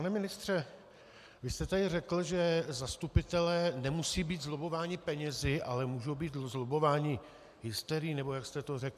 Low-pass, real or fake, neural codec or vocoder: 14.4 kHz; real; none